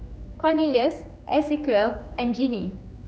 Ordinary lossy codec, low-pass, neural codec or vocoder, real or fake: none; none; codec, 16 kHz, 2 kbps, X-Codec, HuBERT features, trained on general audio; fake